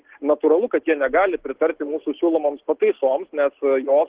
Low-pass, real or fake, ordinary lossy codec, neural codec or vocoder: 3.6 kHz; real; Opus, 16 kbps; none